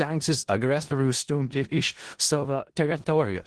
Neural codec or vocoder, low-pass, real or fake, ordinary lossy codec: codec, 16 kHz in and 24 kHz out, 0.4 kbps, LongCat-Audio-Codec, four codebook decoder; 10.8 kHz; fake; Opus, 16 kbps